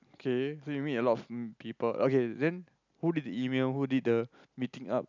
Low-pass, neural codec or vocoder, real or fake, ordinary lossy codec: 7.2 kHz; none; real; none